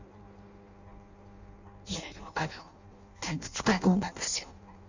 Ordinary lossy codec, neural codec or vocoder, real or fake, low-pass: none; codec, 16 kHz in and 24 kHz out, 0.6 kbps, FireRedTTS-2 codec; fake; 7.2 kHz